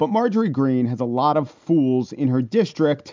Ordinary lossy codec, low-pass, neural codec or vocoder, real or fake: MP3, 64 kbps; 7.2 kHz; none; real